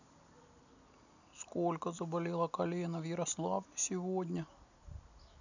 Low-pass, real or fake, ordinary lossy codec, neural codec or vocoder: 7.2 kHz; real; none; none